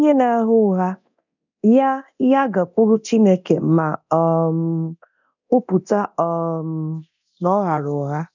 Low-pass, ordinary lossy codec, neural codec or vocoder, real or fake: 7.2 kHz; none; codec, 24 kHz, 0.9 kbps, DualCodec; fake